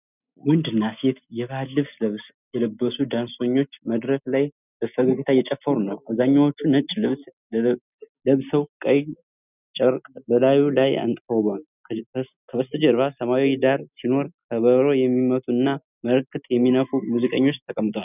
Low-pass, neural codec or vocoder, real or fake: 3.6 kHz; none; real